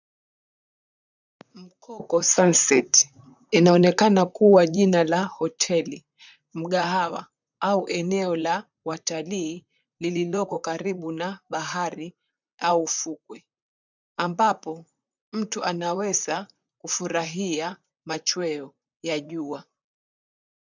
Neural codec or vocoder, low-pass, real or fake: vocoder, 44.1 kHz, 128 mel bands, Pupu-Vocoder; 7.2 kHz; fake